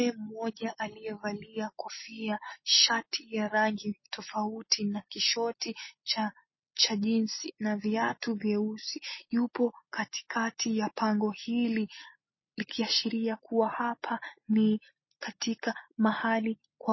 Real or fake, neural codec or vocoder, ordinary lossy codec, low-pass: real; none; MP3, 24 kbps; 7.2 kHz